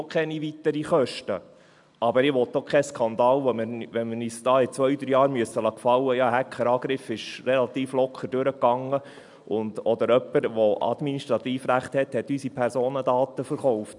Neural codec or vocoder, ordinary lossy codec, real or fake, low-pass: none; MP3, 96 kbps; real; 10.8 kHz